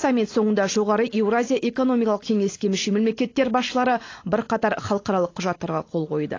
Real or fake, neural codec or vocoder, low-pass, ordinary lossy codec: real; none; 7.2 kHz; AAC, 32 kbps